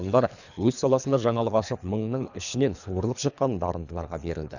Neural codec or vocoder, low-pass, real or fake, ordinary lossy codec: codec, 24 kHz, 3 kbps, HILCodec; 7.2 kHz; fake; none